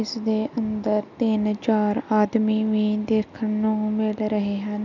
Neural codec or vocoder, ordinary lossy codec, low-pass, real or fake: none; none; 7.2 kHz; real